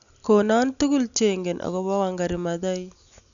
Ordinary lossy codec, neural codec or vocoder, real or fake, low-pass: none; none; real; 7.2 kHz